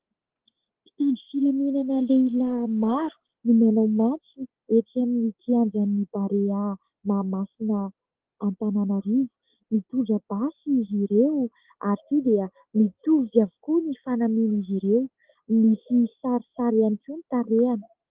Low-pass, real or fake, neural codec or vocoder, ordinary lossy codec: 3.6 kHz; real; none; Opus, 16 kbps